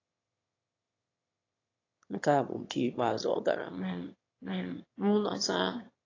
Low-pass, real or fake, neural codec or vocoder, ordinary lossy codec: 7.2 kHz; fake; autoencoder, 22.05 kHz, a latent of 192 numbers a frame, VITS, trained on one speaker; AAC, 32 kbps